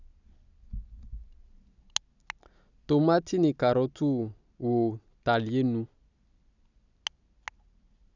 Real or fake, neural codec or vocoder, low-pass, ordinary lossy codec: real; none; 7.2 kHz; none